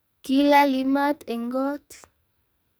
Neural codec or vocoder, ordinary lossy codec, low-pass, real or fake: codec, 44.1 kHz, 2.6 kbps, SNAC; none; none; fake